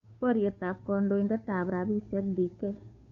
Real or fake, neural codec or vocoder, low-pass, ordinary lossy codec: fake; codec, 16 kHz, 2 kbps, FunCodec, trained on Chinese and English, 25 frames a second; 7.2 kHz; AAC, 64 kbps